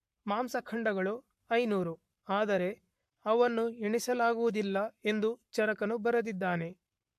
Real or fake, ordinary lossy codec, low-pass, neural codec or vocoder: fake; MP3, 64 kbps; 14.4 kHz; codec, 44.1 kHz, 7.8 kbps, Pupu-Codec